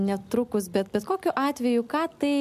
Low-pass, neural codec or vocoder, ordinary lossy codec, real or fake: 14.4 kHz; none; MP3, 96 kbps; real